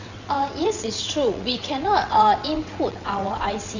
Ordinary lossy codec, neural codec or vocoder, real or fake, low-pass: Opus, 64 kbps; vocoder, 22.05 kHz, 80 mel bands, WaveNeXt; fake; 7.2 kHz